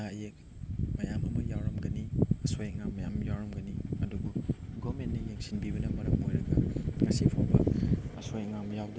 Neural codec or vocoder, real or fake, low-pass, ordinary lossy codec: none; real; none; none